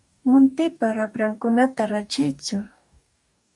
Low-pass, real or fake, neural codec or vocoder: 10.8 kHz; fake; codec, 44.1 kHz, 2.6 kbps, DAC